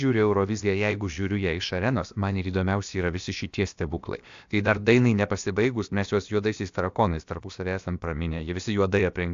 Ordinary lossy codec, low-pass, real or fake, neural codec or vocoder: AAC, 64 kbps; 7.2 kHz; fake; codec, 16 kHz, about 1 kbps, DyCAST, with the encoder's durations